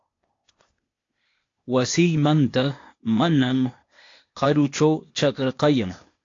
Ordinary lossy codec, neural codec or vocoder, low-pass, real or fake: AAC, 48 kbps; codec, 16 kHz, 0.8 kbps, ZipCodec; 7.2 kHz; fake